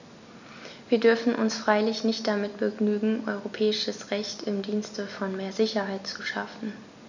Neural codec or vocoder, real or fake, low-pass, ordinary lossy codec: none; real; 7.2 kHz; none